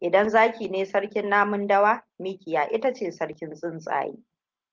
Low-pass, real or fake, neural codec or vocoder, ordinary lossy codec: 7.2 kHz; real; none; Opus, 32 kbps